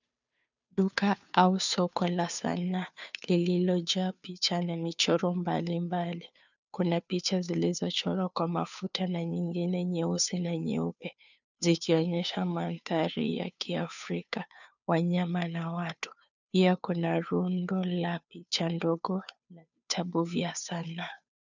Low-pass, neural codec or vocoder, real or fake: 7.2 kHz; codec, 16 kHz, 2 kbps, FunCodec, trained on Chinese and English, 25 frames a second; fake